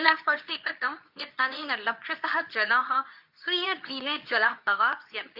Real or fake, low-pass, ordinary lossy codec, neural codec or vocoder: fake; 5.4 kHz; AAC, 48 kbps; codec, 24 kHz, 0.9 kbps, WavTokenizer, medium speech release version 2